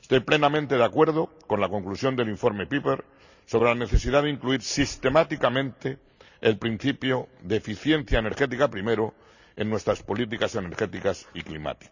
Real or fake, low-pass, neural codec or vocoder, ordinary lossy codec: real; 7.2 kHz; none; none